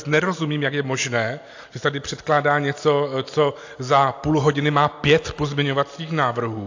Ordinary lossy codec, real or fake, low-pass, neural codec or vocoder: AAC, 48 kbps; real; 7.2 kHz; none